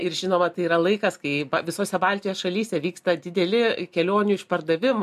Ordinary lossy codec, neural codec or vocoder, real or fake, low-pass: MP3, 96 kbps; none; real; 14.4 kHz